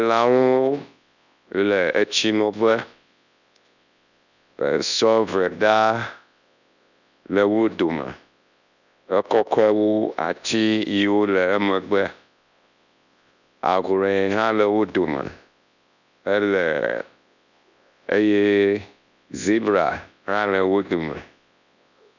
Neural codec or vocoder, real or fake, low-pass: codec, 24 kHz, 0.9 kbps, WavTokenizer, large speech release; fake; 7.2 kHz